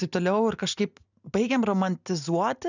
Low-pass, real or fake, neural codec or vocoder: 7.2 kHz; real; none